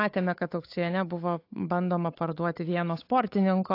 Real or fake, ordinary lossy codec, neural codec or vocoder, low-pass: fake; AAC, 32 kbps; codec, 16 kHz, 8 kbps, FunCodec, trained on Chinese and English, 25 frames a second; 5.4 kHz